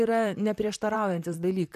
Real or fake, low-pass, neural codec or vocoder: fake; 14.4 kHz; vocoder, 44.1 kHz, 128 mel bands, Pupu-Vocoder